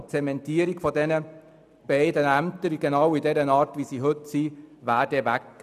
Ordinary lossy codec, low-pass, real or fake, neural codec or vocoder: none; 14.4 kHz; real; none